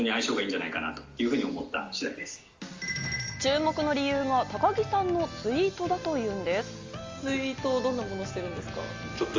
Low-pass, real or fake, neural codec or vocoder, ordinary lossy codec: 7.2 kHz; real; none; Opus, 32 kbps